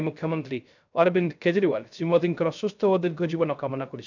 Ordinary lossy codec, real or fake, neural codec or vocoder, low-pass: none; fake; codec, 16 kHz, 0.3 kbps, FocalCodec; 7.2 kHz